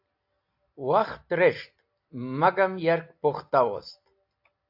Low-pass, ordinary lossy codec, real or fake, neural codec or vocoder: 5.4 kHz; AAC, 48 kbps; real; none